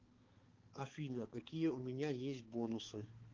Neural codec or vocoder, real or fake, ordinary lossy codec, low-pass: codec, 16 kHz, 4 kbps, X-Codec, HuBERT features, trained on balanced general audio; fake; Opus, 16 kbps; 7.2 kHz